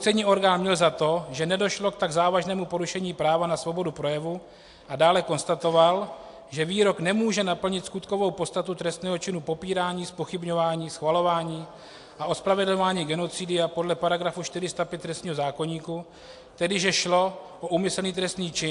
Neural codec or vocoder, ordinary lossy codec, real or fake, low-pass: none; AAC, 64 kbps; real; 10.8 kHz